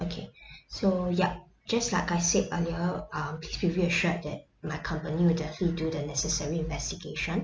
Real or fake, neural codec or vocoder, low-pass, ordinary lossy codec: real; none; none; none